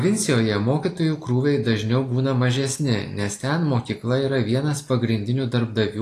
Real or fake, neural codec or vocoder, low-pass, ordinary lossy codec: real; none; 14.4 kHz; AAC, 48 kbps